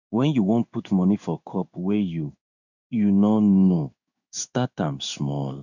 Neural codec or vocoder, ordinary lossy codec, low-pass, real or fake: codec, 16 kHz in and 24 kHz out, 1 kbps, XY-Tokenizer; none; 7.2 kHz; fake